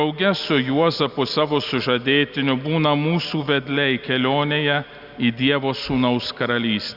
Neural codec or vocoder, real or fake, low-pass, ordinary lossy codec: none; real; 5.4 kHz; Opus, 64 kbps